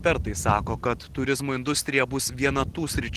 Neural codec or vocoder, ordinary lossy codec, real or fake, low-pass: none; Opus, 16 kbps; real; 14.4 kHz